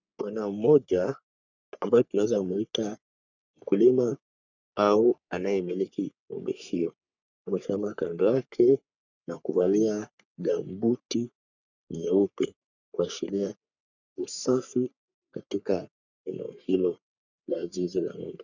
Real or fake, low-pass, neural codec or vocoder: fake; 7.2 kHz; codec, 44.1 kHz, 3.4 kbps, Pupu-Codec